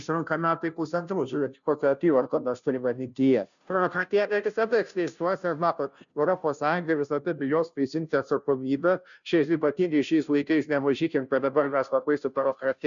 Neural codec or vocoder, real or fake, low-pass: codec, 16 kHz, 0.5 kbps, FunCodec, trained on Chinese and English, 25 frames a second; fake; 7.2 kHz